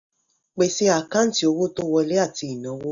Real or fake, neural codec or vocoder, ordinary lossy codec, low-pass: real; none; none; 7.2 kHz